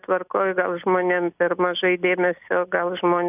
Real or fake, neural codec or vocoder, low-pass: real; none; 3.6 kHz